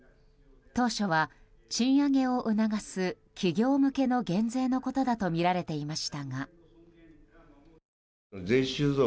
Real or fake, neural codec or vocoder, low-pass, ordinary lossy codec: real; none; none; none